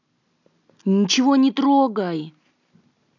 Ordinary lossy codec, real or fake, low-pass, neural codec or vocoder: none; real; 7.2 kHz; none